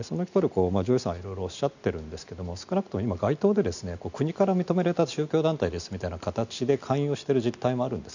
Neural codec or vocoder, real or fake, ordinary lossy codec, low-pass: none; real; none; 7.2 kHz